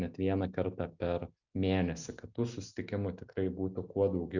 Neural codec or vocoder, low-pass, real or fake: none; 7.2 kHz; real